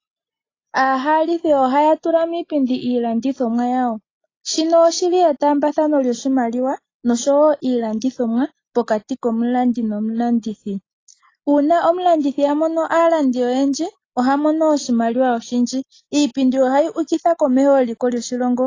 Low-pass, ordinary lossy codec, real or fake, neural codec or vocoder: 7.2 kHz; AAC, 32 kbps; real; none